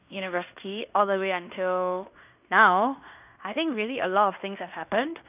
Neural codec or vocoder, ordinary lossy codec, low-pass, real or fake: codec, 16 kHz in and 24 kHz out, 0.9 kbps, LongCat-Audio-Codec, fine tuned four codebook decoder; none; 3.6 kHz; fake